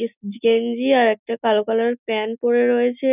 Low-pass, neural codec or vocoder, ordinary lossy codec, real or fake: 3.6 kHz; none; none; real